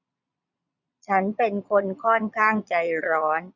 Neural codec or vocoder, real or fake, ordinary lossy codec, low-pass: vocoder, 22.05 kHz, 80 mel bands, Vocos; fake; none; 7.2 kHz